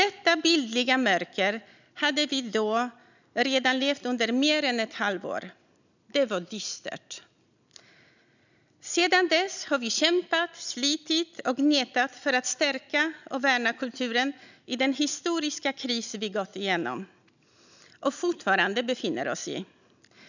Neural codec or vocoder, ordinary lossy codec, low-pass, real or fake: none; none; 7.2 kHz; real